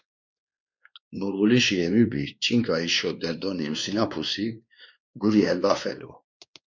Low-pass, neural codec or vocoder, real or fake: 7.2 kHz; codec, 16 kHz, 2 kbps, X-Codec, WavLM features, trained on Multilingual LibriSpeech; fake